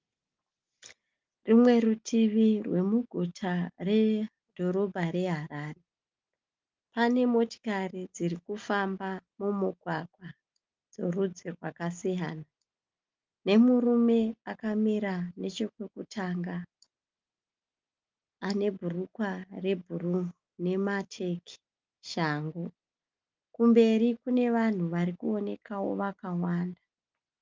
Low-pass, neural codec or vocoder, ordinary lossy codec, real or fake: 7.2 kHz; none; Opus, 32 kbps; real